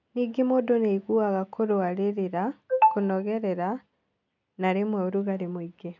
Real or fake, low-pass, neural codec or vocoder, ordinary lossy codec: real; 7.2 kHz; none; none